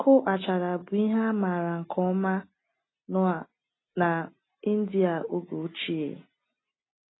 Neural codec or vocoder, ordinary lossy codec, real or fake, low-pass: none; AAC, 16 kbps; real; 7.2 kHz